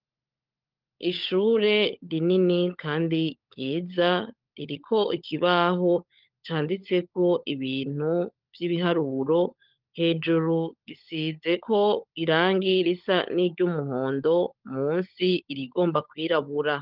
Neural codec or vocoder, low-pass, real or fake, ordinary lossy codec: codec, 16 kHz, 16 kbps, FunCodec, trained on LibriTTS, 50 frames a second; 5.4 kHz; fake; Opus, 32 kbps